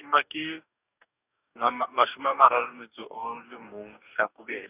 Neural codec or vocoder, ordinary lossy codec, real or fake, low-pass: codec, 44.1 kHz, 2.6 kbps, DAC; none; fake; 3.6 kHz